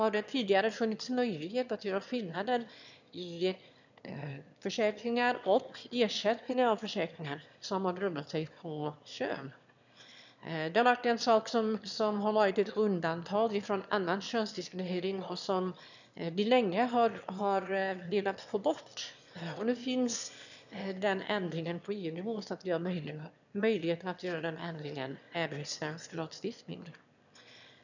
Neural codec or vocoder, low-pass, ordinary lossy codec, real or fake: autoencoder, 22.05 kHz, a latent of 192 numbers a frame, VITS, trained on one speaker; 7.2 kHz; none; fake